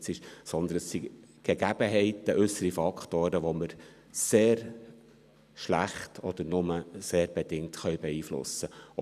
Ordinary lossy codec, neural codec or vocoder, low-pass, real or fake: none; none; 14.4 kHz; real